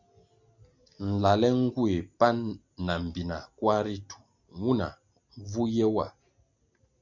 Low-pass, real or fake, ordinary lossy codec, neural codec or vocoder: 7.2 kHz; real; MP3, 64 kbps; none